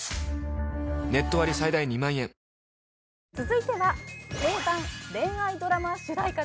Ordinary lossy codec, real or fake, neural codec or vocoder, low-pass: none; real; none; none